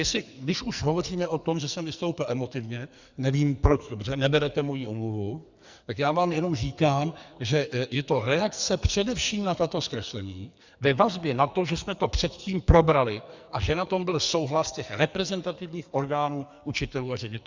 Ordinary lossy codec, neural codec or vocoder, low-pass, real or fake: Opus, 64 kbps; codec, 44.1 kHz, 2.6 kbps, SNAC; 7.2 kHz; fake